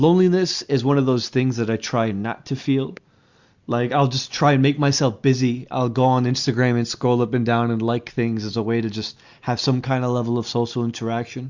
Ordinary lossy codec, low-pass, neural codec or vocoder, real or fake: Opus, 64 kbps; 7.2 kHz; none; real